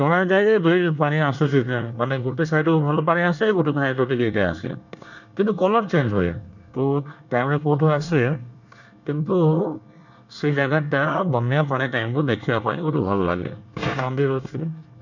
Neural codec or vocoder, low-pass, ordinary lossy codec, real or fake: codec, 24 kHz, 1 kbps, SNAC; 7.2 kHz; none; fake